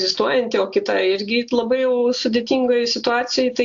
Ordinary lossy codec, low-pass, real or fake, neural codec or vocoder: AAC, 64 kbps; 7.2 kHz; real; none